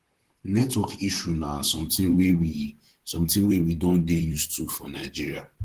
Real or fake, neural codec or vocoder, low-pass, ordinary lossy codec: fake; vocoder, 44.1 kHz, 128 mel bands, Pupu-Vocoder; 14.4 kHz; Opus, 16 kbps